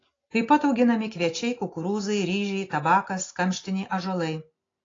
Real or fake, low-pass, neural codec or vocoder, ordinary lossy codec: real; 7.2 kHz; none; AAC, 32 kbps